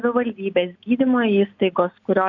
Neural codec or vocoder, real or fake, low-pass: none; real; 7.2 kHz